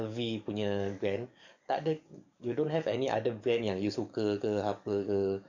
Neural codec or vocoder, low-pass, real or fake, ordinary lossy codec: codec, 44.1 kHz, 7.8 kbps, DAC; 7.2 kHz; fake; none